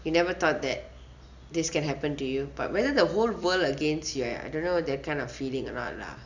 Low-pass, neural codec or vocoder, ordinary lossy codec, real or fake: 7.2 kHz; none; Opus, 64 kbps; real